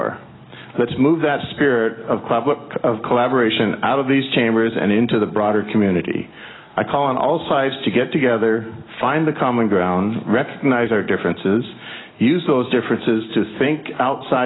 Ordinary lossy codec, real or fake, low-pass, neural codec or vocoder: AAC, 16 kbps; real; 7.2 kHz; none